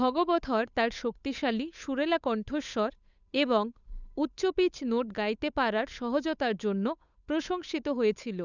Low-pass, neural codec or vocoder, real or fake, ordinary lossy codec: 7.2 kHz; codec, 16 kHz, 8 kbps, FunCodec, trained on Chinese and English, 25 frames a second; fake; none